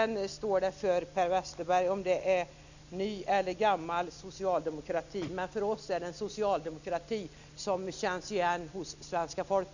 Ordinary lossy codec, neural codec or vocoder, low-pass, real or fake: none; none; 7.2 kHz; real